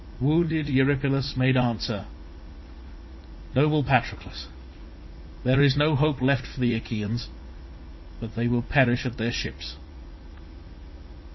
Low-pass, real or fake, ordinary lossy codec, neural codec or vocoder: 7.2 kHz; fake; MP3, 24 kbps; vocoder, 44.1 kHz, 80 mel bands, Vocos